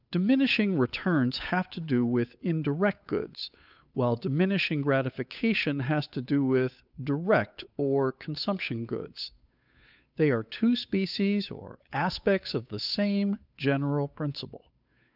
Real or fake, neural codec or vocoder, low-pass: fake; codec, 16 kHz, 8 kbps, FunCodec, trained on Chinese and English, 25 frames a second; 5.4 kHz